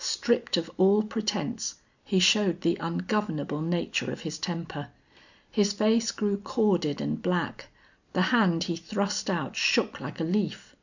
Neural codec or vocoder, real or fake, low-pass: none; real; 7.2 kHz